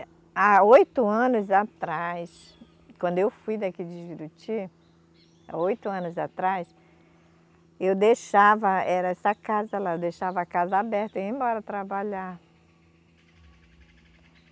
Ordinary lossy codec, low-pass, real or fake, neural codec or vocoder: none; none; real; none